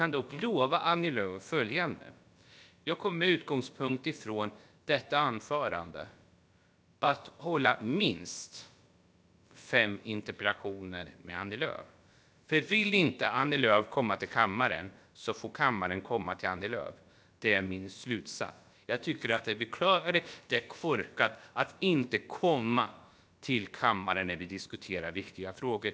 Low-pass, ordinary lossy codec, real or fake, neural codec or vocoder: none; none; fake; codec, 16 kHz, about 1 kbps, DyCAST, with the encoder's durations